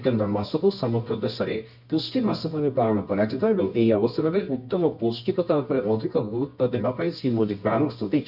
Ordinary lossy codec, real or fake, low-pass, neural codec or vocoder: none; fake; 5.4 kHz; codec, 24 kHz, 0.9 kbps, WavTokenizer, medium music audio release